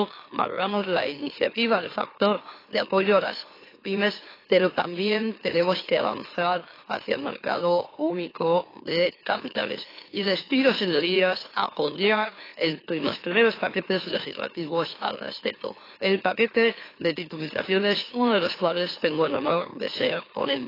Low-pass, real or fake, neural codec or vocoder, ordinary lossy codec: 5.4 kHz; fake; autoencoder, 44.1 kHz, a latent of 192 numbers a frame, MeloTTS; AAC, 24 kbps